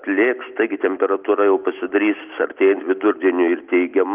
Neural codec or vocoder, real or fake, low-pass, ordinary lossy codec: none; real; 3.6 kHz; Opus, 24 kbps